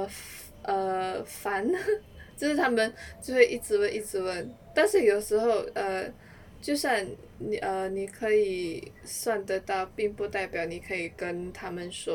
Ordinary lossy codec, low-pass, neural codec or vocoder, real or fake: none; 19.8 kHz; none; real